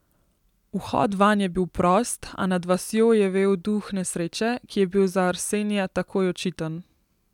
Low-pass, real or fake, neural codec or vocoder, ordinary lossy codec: 19.8 kHz; real; none; none